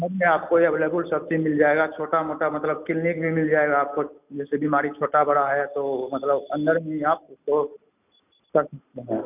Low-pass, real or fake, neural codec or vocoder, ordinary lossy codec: 3.6 kHz; real; none; none